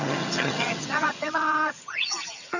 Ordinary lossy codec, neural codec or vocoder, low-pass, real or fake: none; vocoder, 22.05 kHz, 80 mel bands, HiFi-GAN; 7.2 kHz; fake